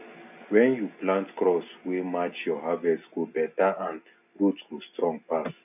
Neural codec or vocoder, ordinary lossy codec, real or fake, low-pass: none; none; real; 3.6 kHz